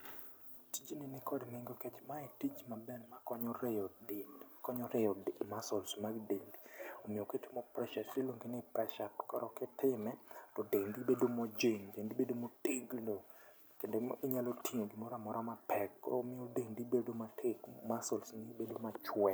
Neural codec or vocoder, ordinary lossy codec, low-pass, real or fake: none; none; none; real